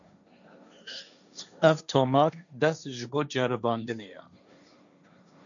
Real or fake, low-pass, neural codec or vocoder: fake; 7.2 kHz; codec, 16 kHz, 1.1 kbps, Voila-Tokenizer